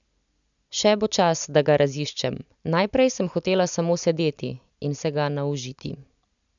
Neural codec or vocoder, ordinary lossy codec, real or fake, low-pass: none; none; real; 7.2 kHz